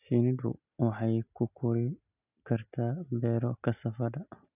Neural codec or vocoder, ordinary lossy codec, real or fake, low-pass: none; none; real; 3.6 kHz